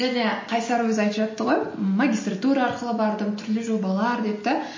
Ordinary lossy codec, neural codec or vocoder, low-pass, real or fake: MP3, 32 kbps; none; 7.2 kHz; real